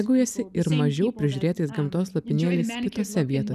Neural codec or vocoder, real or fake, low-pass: none; real; 14.4 kHz